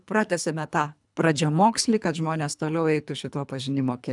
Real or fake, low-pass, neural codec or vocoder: fake; 10.8 kHz; codec, 24 kHz, 3 kbps, HILCodec